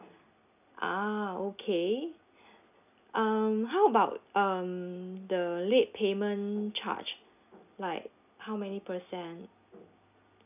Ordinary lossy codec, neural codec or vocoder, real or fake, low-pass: none; none; real; 3.6 kHz